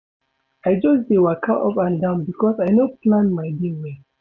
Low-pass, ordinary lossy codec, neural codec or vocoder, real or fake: none; none; none; real